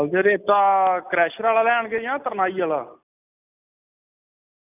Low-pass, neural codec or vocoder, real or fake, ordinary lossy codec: 3.6 kHz; none; real; none